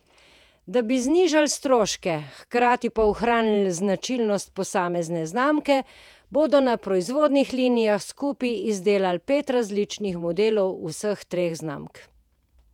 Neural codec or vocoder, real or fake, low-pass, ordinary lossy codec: vocoder, 48 kHz, 128 mel bands, Vocos; fake; 19.8 kHz; none